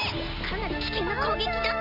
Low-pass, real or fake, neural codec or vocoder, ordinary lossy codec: 5.4 kHz; real; none; none